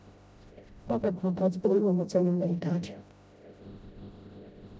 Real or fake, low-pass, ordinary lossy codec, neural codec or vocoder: fake; none; none; codec, 16 kHz, 0.5 kbps, FreqCodec, smaller model